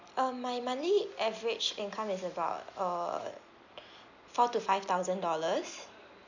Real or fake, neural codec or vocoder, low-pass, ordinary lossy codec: real; none; 7.2 kHz; none